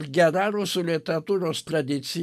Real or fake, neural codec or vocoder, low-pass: real; none; 14.4 kHz